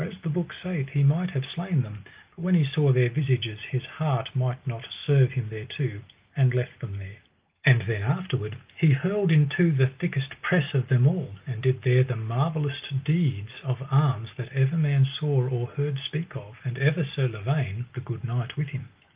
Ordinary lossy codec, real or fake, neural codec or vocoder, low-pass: Opus, 32 kbps; real; none; 3.6 kHz